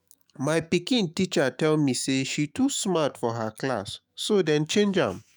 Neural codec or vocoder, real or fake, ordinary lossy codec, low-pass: autoencoder, 48 kHz, 128 numbers a frame, DAC-VAE, trained on Japanese speech; fake; none; none